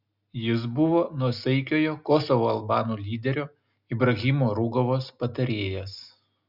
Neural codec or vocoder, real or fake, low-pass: none; real; 5.4 kHz